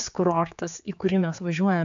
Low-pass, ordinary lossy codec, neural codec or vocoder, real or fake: 7.2 kHz; AAC, 64 kbps; codec, 16 kHz, 4 kbps, X-Codec, HuBERT features, trained on general audio; fake